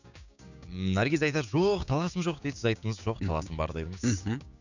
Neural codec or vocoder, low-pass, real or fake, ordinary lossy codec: codec, 16 kHz, 6 kbps, DAC; 7.2 kHz; fake; none